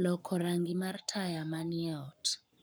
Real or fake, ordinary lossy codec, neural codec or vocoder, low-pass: fake; none; codec, 44.1 kHz, 7.8 kbps, Pupu-Codec; none